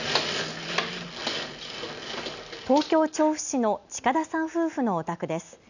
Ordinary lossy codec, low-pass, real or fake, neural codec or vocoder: none; 7.2 kHz; real; none